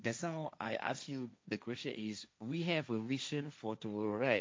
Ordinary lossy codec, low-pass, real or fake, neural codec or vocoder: none; none; fake; codec, 16 kHz, 1.1 kbps, Voila-Tokenizer